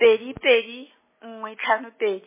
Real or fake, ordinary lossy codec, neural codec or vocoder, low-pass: real; MP3, 16 kbps; none; 3.6 kHz